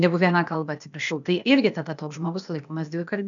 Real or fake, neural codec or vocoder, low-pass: fake; codec, 16 kHz, 0.8 kbps, ZipCodec; 7.2 kHz